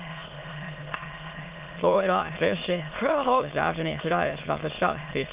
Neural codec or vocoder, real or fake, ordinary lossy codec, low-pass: autoencoder, 22.05 kHz, a latent of 192 numbers a frame, VITS, trained on many speakers; fake; Opus, 24 kbps; 3.6 kHz